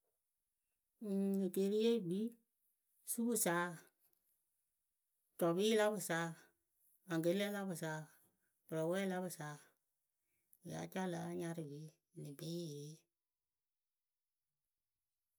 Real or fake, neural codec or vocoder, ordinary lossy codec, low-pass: real; none; none; none